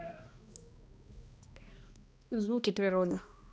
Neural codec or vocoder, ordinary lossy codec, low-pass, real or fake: codec, 16 kHz, 1 kbps, X-Codec, HuBERT features, trained on balanced general audio; none; none; fake